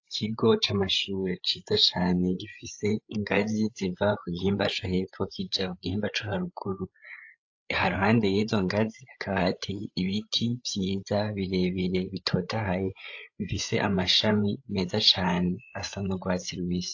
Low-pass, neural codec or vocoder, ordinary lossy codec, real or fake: 7.2 kHz; codec, 16 kHz, 8 kbps, FreqCodec, larger model; AAC, 48 kbps; fake